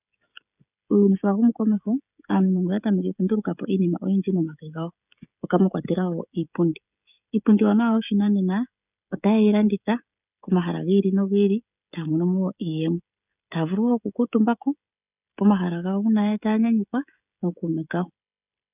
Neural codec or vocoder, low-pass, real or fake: codec, 16 kHz, 16 kbps, FreqCodec, smaller model; 3.6 kHz; fake